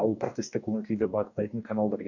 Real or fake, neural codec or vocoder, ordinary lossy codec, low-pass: fake; codec, 44.1 kHz, 2.6 kbps, DAC; none; 7.2 kHz